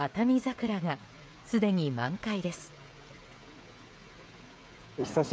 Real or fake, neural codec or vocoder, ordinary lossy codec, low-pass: fake; codec, 16 kHz, 16 kbps, FreqCodec, smaller model; none; none